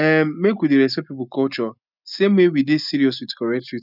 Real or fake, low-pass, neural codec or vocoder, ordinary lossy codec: real; 5.4 kHz; none; none